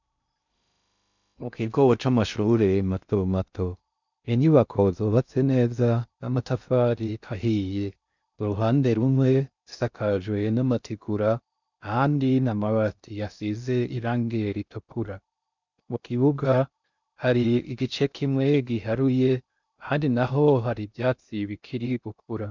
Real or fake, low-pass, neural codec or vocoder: fake; 7.2 kHz; codec, 16 kHz in and 24 kHz out, 0.6 kbps, FocalCodec, streaming, 2048 codes